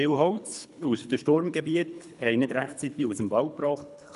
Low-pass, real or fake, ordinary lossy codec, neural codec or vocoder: 10.8 kHz; fake; none; codec, 24 kHz, 3 kbps, HILCodec